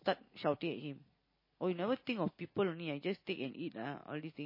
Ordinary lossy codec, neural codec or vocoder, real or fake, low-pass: MP3, 24 kbps; none; real; 5.4 kHz